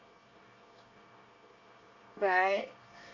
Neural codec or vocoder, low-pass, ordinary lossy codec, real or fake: codec, 24 kHz, 1 kbps, SNAC; 7.2 kHz; MP3, 48 kbps; fake